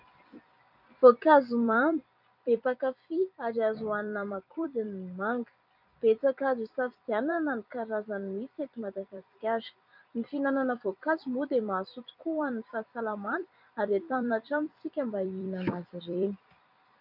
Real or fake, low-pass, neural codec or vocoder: fake; 5.4 kHz; vocoder, 44.1 kHz, 128 mel bands every 256 samples, BigVGAN v2